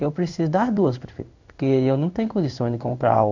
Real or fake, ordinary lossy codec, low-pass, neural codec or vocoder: fake; none; 7.2 kHz; codec, 16 kHz in and 24 kHz out, 1 kbps, XY-Tokenizer